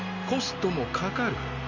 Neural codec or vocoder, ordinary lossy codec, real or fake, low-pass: none; none; real; 7.2 kHz